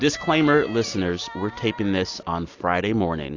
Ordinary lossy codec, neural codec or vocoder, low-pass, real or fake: AAC, 48 kbps; none; 7.2 kHz; real